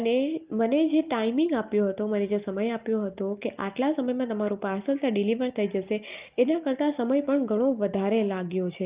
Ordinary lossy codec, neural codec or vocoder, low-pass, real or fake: Opus, 32 kbps; none; 3.6 kHz; real